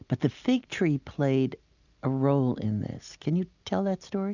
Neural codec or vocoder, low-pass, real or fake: none; 7.2 kHz; real